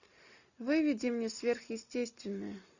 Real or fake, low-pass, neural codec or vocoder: real; 7.2 kHz; none